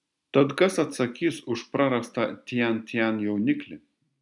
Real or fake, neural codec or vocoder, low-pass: real; none; 10.8 kHz